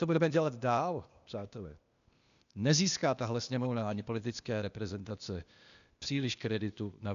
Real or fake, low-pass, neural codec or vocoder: fake; 7.2 kHz; codec, 16 kHz, 0.8 kbps, ZipCodec